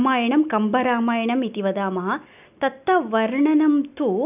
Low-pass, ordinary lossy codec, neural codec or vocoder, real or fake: 3.6 kHz; none; none; real